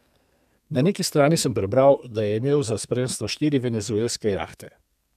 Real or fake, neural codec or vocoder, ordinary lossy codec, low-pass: fake; codec, 32 kHz, 1.9 kbps, SNAC; none; 14.4 kHz